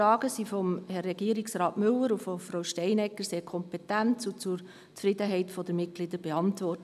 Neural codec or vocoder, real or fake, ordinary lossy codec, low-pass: none; real; none; 14.4 kHz